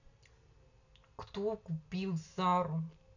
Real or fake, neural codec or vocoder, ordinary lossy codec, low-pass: real; none; none; 7.2 kHz